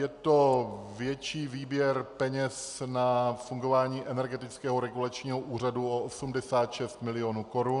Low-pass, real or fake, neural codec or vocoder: 10.8 kHz; real; none